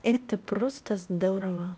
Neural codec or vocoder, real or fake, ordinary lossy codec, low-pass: codec, 16 kHz, 0.8 kbps, ZipCodec; fake; none; none